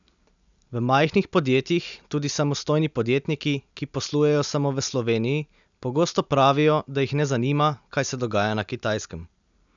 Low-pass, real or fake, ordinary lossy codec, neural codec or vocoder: 7.2 kHz; real; none; none